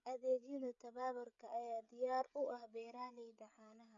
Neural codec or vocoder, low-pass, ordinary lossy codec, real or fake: codec, 16 kHz, 16 kbps, FreqCodec, smaller model; 7.2 kHz; none; fake